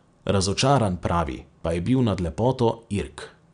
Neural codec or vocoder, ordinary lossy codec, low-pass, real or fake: none; none; 9.9 kHz; real